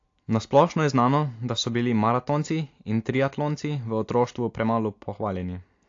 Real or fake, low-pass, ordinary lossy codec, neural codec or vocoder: real; 7.2 kHz; AAC, 48 kbps; none